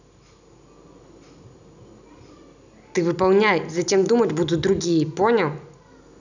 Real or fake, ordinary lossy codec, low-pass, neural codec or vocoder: real; none; 7.2 kHz; none